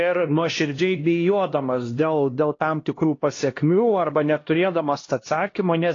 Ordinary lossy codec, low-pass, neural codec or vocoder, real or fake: AAC, 32 kbps; 7.2 kHz; codec, 16 kHz, 1 kbps, X-Codec, HuBERT features, trained on LibriSpeech; fake